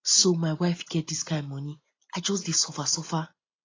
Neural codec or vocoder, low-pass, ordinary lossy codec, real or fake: none; 7.2 kHz; AAC, 32 kbps; real